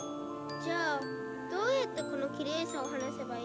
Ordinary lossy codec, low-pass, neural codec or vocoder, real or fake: none; none; none; real